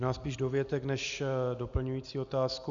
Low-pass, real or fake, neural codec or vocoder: 7.2 kHz; real; none